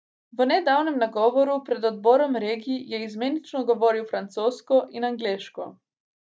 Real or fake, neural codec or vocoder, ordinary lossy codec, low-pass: real; none; none; none